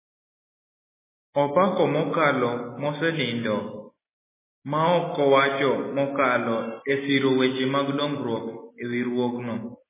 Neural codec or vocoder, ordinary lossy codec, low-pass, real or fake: none; MP3, 16 kbps; 3.6 kHz; real